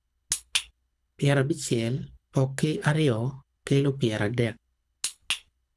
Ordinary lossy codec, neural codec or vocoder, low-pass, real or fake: none; codec, 24 kHz, 6 kbps, HILCodec; none; fake